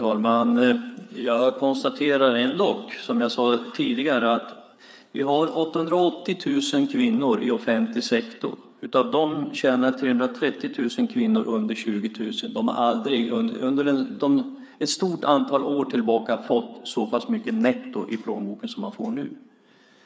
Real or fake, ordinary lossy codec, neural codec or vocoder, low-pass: fake; none; codec, 16 kHz, 4 kbps, FreqCodec, larger model; none